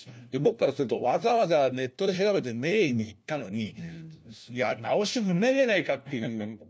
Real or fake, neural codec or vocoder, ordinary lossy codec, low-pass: fake; codec, 16 kHz, 1 kbps, FunCodec, trained on LibriTTS, 50 frames a second; none; none